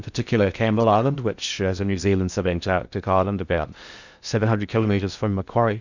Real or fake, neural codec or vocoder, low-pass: fake; codec, 16 kHz in and 24 kHz out, 0.6 kbps, FocalCodec, streaming, 4096 codes; 7.2 kHz